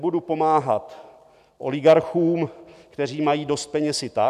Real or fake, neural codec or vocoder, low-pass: real; none; 14.4 kHz